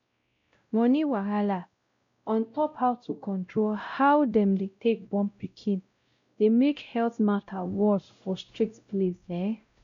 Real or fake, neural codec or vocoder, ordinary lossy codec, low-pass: fake; codec, 16 kHz, 0.5 kbps, X-Codec, WavLM features, trained on Multilingual LibriSpeech; MP3, 64 kbps; 7.2 kHz